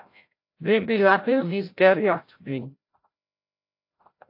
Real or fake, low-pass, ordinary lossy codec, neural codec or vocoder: fake; 5.4 kHz; AAC, 32 kbps; codec, 16 kHz, 0.5 kbps, FreqCodec, larger model